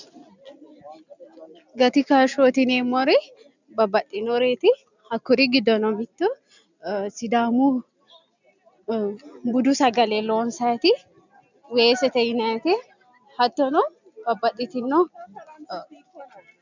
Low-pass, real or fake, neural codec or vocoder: 7.2 kHz; real; none